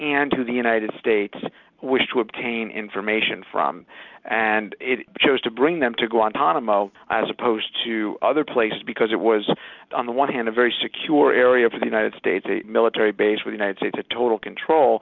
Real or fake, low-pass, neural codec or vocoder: real; 7.2 kHz; none